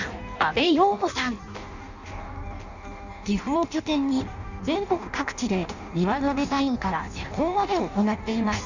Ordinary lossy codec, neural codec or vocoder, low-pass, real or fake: none; codec, 16 kHz in and 24 kHz out, 0.6 kbps, FireRedTTS-2 codec; 7.2 kHz; fake